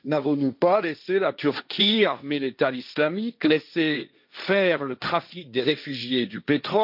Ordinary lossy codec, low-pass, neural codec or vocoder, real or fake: none; 5.4 kHz; codec, 16 kHz, 1.1 kbps, Voila-Tokenizer; fake